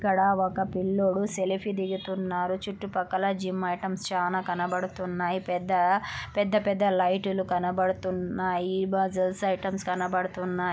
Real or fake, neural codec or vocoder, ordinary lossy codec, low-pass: real; none; none; none